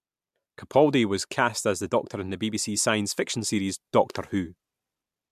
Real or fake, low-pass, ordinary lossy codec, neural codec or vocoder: real; 14.4 kHz; MP3, 96 kbps; none